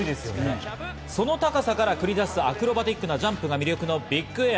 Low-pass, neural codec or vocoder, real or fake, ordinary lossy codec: none; none; real; none